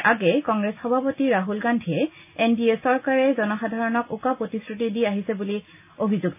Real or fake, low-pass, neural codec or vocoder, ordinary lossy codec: real; 3.6 kHz; none; AAC, 32 kbps